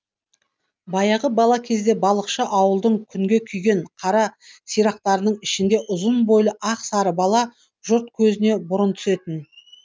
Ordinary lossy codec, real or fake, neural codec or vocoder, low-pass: none; real; none; none